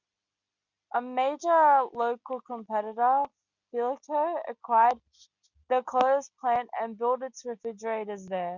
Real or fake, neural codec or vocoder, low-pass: real; none; 7.2 kHz